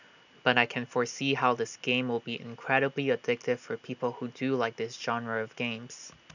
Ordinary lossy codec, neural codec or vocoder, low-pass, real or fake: none; none; 7.2 kHz; real